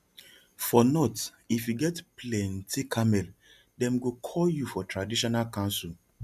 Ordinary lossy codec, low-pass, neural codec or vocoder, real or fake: MP3, 96 kbps; 14.4 kHz; none; real